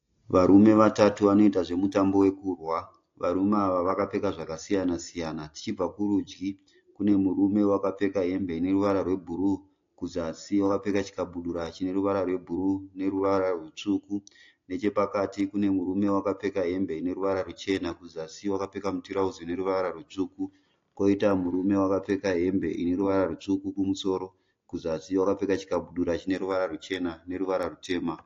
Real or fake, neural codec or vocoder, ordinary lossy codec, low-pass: real; none; AAC, 48 kbps; 7.2 kHz